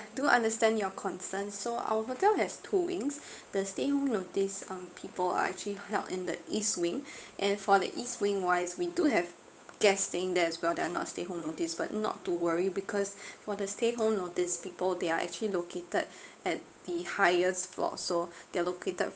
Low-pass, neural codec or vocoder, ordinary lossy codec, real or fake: none; codec, 16 kHz, 8 kbps, FunCodec, trained on Chinese and English, 25 frames a second; none; fake